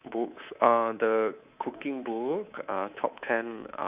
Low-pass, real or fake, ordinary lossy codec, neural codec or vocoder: 3.6 kHz; fake; Opus, 64 kbps; codec, 24 kHz, 3.1 kbps, DualCodec